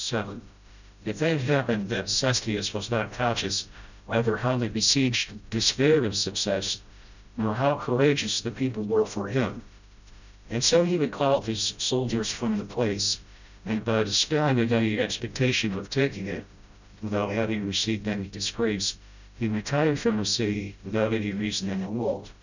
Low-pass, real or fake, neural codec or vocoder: 7.2 kHz; fake; codec, 16 kHz, 0.5 kbps, FreqCodec, smaller model